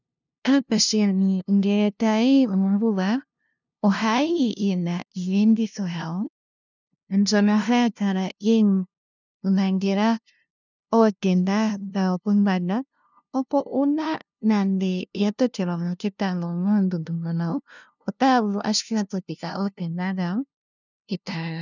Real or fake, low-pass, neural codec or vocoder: fake; 7.2 kHz; codec, 16 kHz, 0.5 kbps, FunCodec, trained on LibriTTS, 25 frames a second